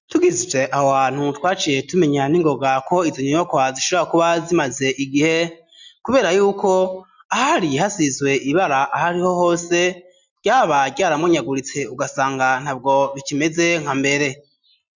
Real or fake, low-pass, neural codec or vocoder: real; 7.2 kHz; none